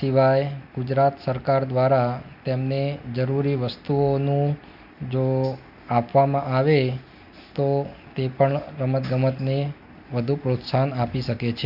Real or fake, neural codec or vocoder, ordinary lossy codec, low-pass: real; none; none; 5.4 kHz